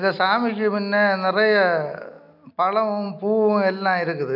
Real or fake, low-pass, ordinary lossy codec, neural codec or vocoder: real; 5.4 kHz; none; none